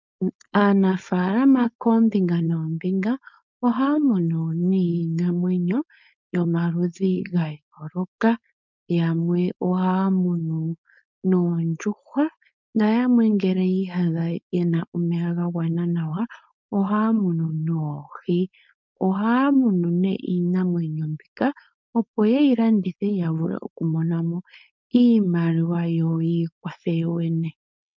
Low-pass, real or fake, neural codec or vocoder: 7.2 kHz; fake; codec, 16 kHz, 4.8 kbps, FACodec